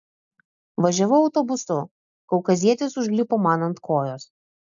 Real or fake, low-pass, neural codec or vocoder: real; 7.2 kHz; none